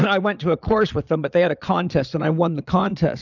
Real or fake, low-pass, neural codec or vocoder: fake; 7.2 kHz; vocoder, 22.05 kHz, 80 mel bands, Vocos